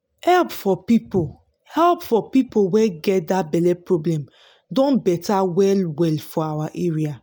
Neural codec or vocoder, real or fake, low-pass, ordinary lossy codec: none; real; none; none